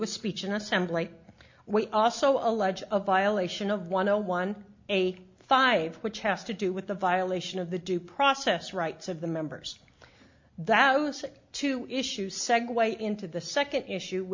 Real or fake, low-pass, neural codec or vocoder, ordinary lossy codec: real; 7.2 kHz; none; MP3, 64 kbps